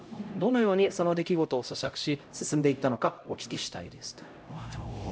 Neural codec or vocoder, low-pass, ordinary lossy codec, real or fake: codec, 16 kHz, 0.5 kbps, X-Codec, HuBERT features, trained on LibriSpeech; none; none; fake